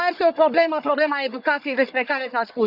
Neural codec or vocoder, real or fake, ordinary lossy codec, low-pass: codec, 44.1 kHz, 1.7 kbps, Pupu-Codec; fake; none; 5.4 kHz